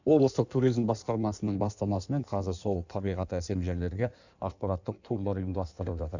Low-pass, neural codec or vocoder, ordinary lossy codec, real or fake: 7.2 kHz; codec, 16 kHz in and 24 kHz out, 1.1 kbps, FireRedTTS-2 codec; none; fake